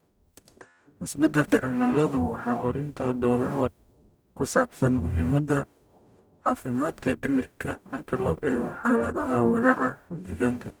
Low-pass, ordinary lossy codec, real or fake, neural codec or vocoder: none; none; fake; codec, 44.1 kHz, 0.9 kbps, DAC